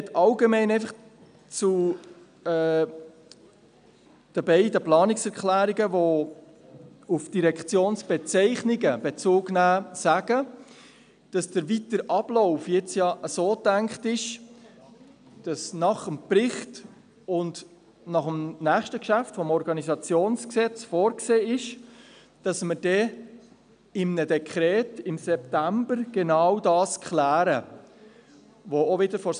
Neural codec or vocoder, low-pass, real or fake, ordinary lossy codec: none; 9.9 kHz; real; none